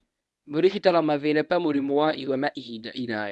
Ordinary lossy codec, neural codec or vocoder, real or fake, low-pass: none; codec, 24 kHz, 0.9 kbps, WavTokenizer, medium speech release version 1; fake; none